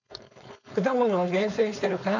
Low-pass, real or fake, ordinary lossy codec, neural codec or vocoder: 7.2 kHz; fake; MP3, 64 kbps; codec, 16 kHz, 4.8 kbps, FACodec